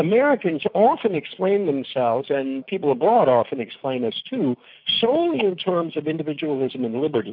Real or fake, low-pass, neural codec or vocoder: fake; 5.4 kHz; codec, 16 kHz, 6 kbps, DAC